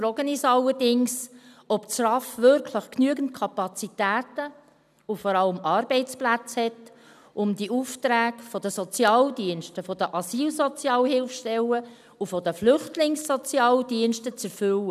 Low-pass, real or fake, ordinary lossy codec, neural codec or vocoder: 14.4 kHz; real; none; none